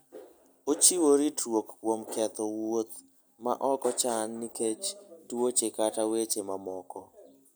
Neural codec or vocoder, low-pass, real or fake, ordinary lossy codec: none; none; real; none